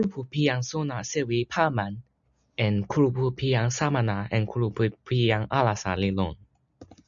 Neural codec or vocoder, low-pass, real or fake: none; 7.2 kHz; real